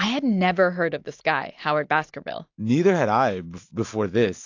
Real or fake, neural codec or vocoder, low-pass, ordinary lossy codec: real; none; 7.2 kHz; AAC, 48 kbps